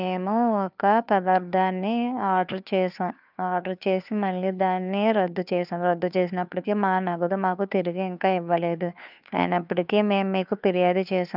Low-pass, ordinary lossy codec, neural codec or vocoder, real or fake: 5.4 kHz; none; codec, 16 kHz, 2 kbps, FunCodec, trained on Chinese and English, 25 frames a second; fake